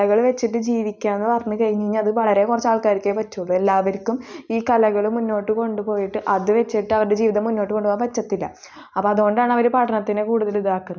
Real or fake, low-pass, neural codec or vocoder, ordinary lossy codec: real; none; none; none